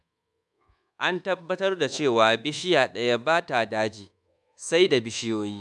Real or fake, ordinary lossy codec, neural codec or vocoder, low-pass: fake; none; codec, 24 kHz, 1.2 kbps, DualCodec; none